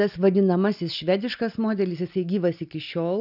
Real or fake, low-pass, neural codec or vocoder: real; 5.4 kHz; none